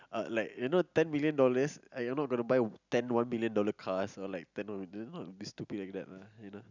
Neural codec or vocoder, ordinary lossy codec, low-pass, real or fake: none; none; 7.2 kHz; real